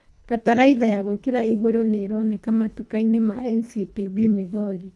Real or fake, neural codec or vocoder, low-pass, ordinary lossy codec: fake; codec, 24 kHz, 1.5 kbps, HILCodec; none; none